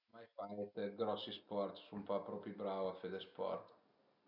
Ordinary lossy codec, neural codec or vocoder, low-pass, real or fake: none; none; 5.4 kHz; real